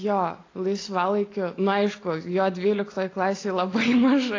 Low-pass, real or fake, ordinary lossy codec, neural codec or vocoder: 7.2 kHz; real; AAC, 32 kbps; none